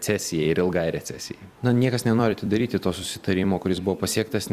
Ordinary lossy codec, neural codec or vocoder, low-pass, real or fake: Opus, 64 kbps; vocoder, 44.1 kHz, 128 mel bands every 256 samples, BigVGAN v2; 14.4 kHz; fake